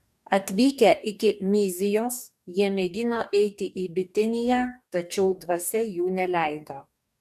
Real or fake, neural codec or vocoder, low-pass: fake; codec, 44.1 kHz, 2.6 kbps, DAC; 14.4 kHz